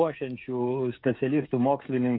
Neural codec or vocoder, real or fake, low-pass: codec, 16 kHz, 8 kbps, FreqCodec, smaller model; fake; 5.4 kHz